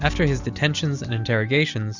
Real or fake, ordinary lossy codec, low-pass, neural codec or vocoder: real; Opus, 64 kbps; 7.2 kHz; none